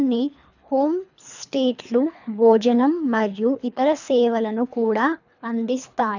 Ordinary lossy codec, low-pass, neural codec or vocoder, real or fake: none; 7.2 kHz; codec, 24 kHz, 3 kbps, HILCodec; fake